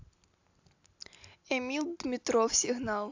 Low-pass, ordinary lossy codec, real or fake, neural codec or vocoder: 7.2 kHz; AAC, 48 kbps; real; none